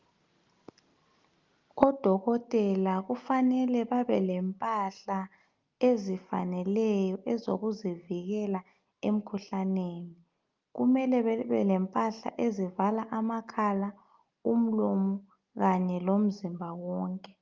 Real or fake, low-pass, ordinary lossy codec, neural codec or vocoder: real; 7.2 kHz; Opus, 24 kbps; none